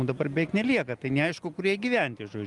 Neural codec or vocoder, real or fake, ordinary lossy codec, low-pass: none; real; Opus, 32 kbps; 10.8 kHz